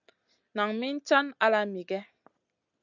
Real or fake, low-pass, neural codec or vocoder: real; 7.2 kHz; none